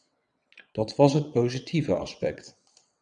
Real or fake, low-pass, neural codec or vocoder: fake; 9.9 kHz; vocoder, 22.05 kHz, 80 mel bands, WaveNeXt